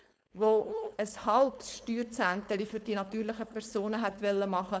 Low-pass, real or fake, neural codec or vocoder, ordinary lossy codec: none; fake; codec, 16 kHz, 4.8 kbps, FACodec; none